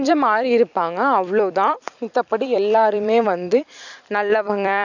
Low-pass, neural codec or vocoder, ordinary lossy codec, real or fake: 7.2 kHz; vocoder, 44.1 kHz, 128 mel bands every 256 samples, BigVGAN v2; none; fake